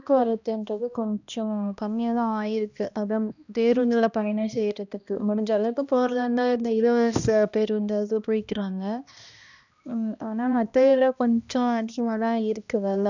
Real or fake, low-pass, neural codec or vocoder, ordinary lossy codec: fake; 7.2 kHz; codec, 16 kHz, 1 kbps, X-Codec, HuBERT features, trained on balanced general audio; none